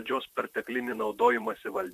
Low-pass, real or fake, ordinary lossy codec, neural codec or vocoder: 14.4 kHz; fake; MP3, 96 kbps; vocoder, 44.1 kHz, 128 mel bands, Pupu-Vocoder